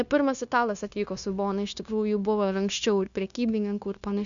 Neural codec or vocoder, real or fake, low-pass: codec, 16 kHz, 0.9 kbps, LongCat-Audio-Codec; fake; 7.2 kHz